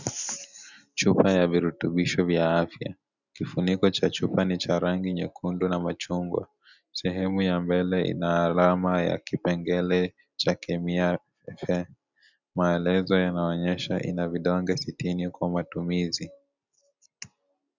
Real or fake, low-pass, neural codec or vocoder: real; 7.2 kHz; none